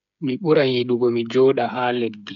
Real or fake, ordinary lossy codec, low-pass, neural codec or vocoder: fake; none; 7.2 kHz; codec, 16 kHz, 8 kbps, FreqCodec, smaller model